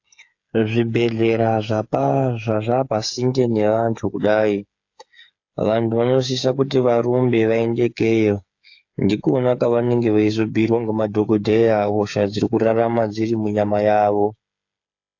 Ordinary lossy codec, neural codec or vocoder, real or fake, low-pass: AAC, 48 kbps; codec, 16 kHz, 8 kbps, FreqCodec, smaller model; fake; 7.2 kHz